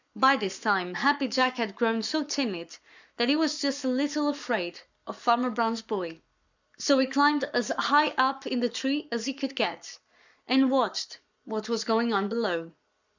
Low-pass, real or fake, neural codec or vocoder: 7.2 kHz; fake; codec, 44.1 kHz, 7.8 kbps, Pupu-Codec